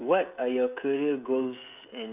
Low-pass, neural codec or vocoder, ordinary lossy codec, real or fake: 3.6 kHz; codec, 16 kHz, 16 kbps, FreqCodec, smaller model; none; fake